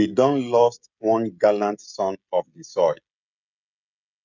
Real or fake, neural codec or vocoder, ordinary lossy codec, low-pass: fake; codec, 16 kHz, 16 kbps, FreqCodec, smaller model; none; 7.2 kHz